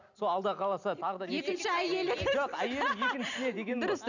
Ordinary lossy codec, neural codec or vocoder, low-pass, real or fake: none; none; 7.2 kHz; real